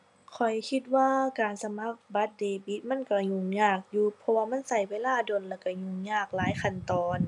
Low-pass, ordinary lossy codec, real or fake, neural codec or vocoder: 10.8 kHz; none; real; none